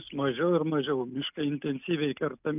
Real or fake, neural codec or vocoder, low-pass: real; none; 3.6 kHz